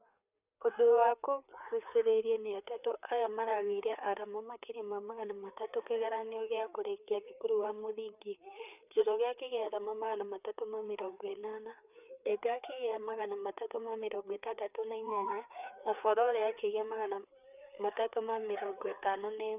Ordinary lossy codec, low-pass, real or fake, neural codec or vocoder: none; 3.6 kHz; fake; codec, 16 kHz, 4 kbps, FreqCodec, larger model